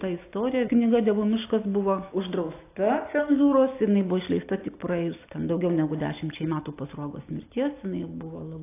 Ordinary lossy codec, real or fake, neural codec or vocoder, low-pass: AAC, 24 kbps; real; none; 3.6 kHz